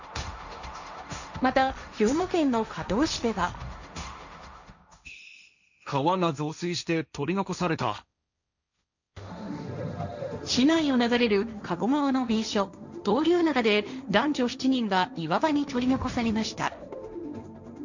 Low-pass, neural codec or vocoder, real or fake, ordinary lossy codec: 7.2 kHz; codec, 16 kHz, 1.1 kbps, Voila-Tokenizer; fake; none